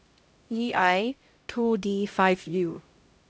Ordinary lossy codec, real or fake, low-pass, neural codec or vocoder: none; fake; none; codec, 16 kHz, 0.5 kbps, X-Codec, HuBERT features, trained on LibriSpeech